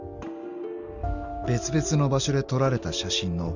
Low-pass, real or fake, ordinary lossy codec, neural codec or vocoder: 7.2 kHz; real; none; none